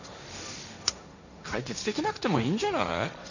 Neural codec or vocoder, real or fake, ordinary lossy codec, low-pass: codec, 16 kHz, 1.1 kbps, Voila-Tokenizer; fake; none; 7.2 kHz